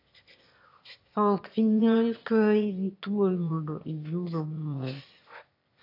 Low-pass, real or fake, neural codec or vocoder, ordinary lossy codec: 5.4 kHz; fake; autoencoder, 22.05 kHz, a latent of 192 numbers a frame, VITS, trained on one speaker; AAC, 32 kbps